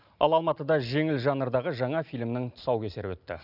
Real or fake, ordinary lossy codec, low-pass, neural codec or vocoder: real; none; 5.4 kHz; none